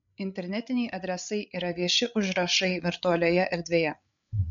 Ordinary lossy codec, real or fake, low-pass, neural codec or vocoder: MP3, 64 kbps; fake; 7.2 kHz; codec, 16 kHz, 8 kbps, FreqCodec, larger model